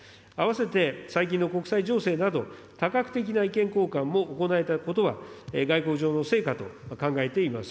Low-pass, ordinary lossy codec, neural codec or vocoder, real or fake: none; none; none; real